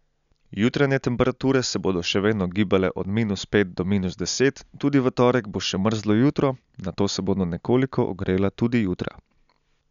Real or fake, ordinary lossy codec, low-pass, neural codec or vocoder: real; none; 7.2 kHz; none